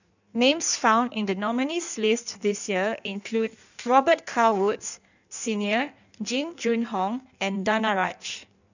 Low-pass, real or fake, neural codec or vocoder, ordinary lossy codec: 7.2 kHz; fake; codec, 16 kHz in and 24 kHz out, 1.1 kbps, FireRedTTS-2 codec; none